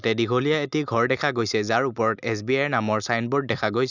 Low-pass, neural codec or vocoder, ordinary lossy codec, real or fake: 7.2 kHz; none; none; real